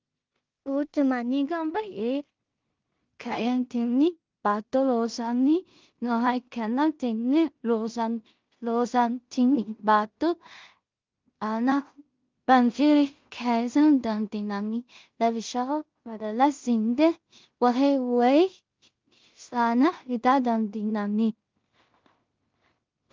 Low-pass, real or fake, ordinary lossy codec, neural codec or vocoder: 7.2 kHz; fake; Opus, 32 kbps; codec, 16 kHz in and 24 kHz out, 0.4 kbps, LongCat-Audio-Codec, two codebook decoder